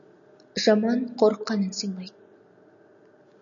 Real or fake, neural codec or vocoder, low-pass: real; none; 7.2 kHz